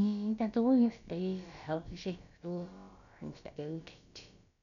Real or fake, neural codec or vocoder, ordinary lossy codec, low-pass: fake; codec, 16 kHz, about 1 kbps, DyCAST, with the encoder's durations; none; 7.2 kHz